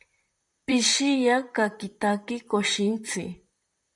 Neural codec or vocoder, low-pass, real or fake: vocoder, 44.1 kHz, 128 mel bands, Pupu-Vocoder; 10.8 kHz; fake